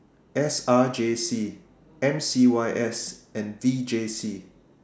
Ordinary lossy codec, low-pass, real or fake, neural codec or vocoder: none; none; real; none